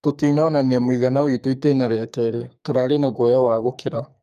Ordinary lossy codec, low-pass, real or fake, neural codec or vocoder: MP3, 96 kbps; 14.4 kHz; fake; codec, 44.1 kHz, 2.6 kbps, SNAC